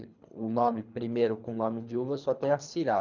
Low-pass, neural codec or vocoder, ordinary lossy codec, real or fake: 7.2 kHz; codec, 24 kHz, 3 kbps, HILCodec; none; fake